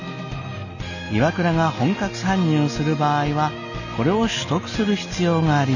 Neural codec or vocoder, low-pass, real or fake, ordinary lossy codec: none; 7.2 kHz; real; none